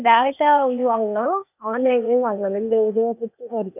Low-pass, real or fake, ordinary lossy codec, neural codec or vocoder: 3.6 kHz; fake; none; codec, 16 kHz, 0.8 kbps, ZipCodec